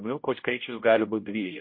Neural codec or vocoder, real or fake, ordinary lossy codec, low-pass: codec, 16 kHz, 0.5 kbps, X-Codec, HuBERT features, trained on general audio; fake; MP3, 24 kbps; 5.4 kHz